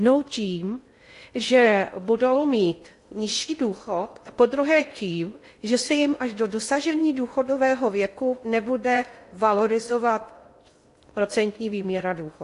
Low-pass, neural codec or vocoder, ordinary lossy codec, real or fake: 10.8 kHz; codec, 16 kHz in and 24 kHz out, 0.6 kbps, FocalCodec, streaming, 2048 codes; AAC, 48 kbps; fake